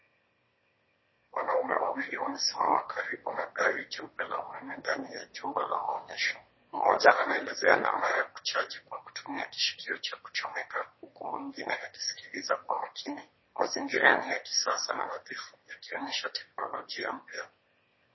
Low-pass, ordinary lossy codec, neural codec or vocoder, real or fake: 7.2 kHz; MP3, 24 kbps; autoencoder, 22.05 kHz, a latent of 192 numbers a frame, VITS, trained on one speaker; fake